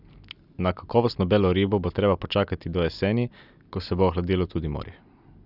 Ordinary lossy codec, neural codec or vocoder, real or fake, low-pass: none; none; real; 5.4 kHz